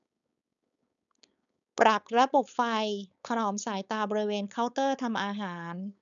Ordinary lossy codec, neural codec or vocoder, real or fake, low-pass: none; codec, 16 kHz, 4.8 kbps, FACodec; fake; 7.2 kHz